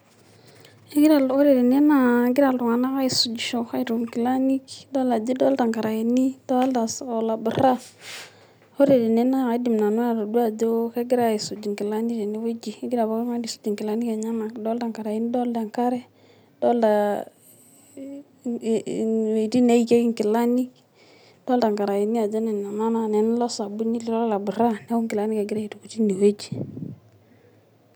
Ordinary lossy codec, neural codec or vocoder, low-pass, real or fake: none; none; none; real